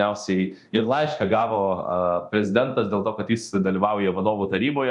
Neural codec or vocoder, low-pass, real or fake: codec, 24 kHz, 0.9 kbps, DualCodec; 10.8 kHz; fake